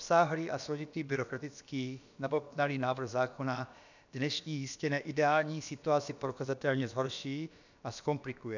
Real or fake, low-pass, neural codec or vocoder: fake; 7.2 kHz; codec, 16 kHz, about 1 kbps, DyCAST, with the encoder's durations